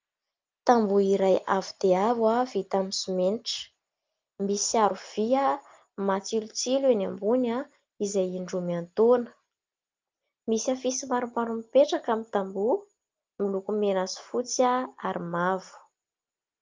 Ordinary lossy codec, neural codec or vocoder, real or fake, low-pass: Opus, 24 kbps; none; real; 7.2 kHz